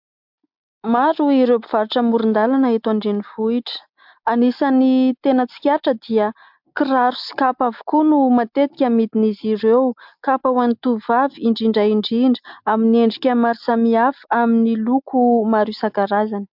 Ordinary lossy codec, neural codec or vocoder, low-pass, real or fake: MP3, 48 kbps; none; 5.4 kHz; real